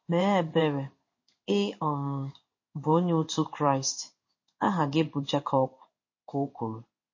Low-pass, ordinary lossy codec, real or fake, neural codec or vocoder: 7.2 kHz; MP3, 32 kbps; fake; codec, 16 kHz in and 24 kHz out, 1 kbps, XY-Tokenizer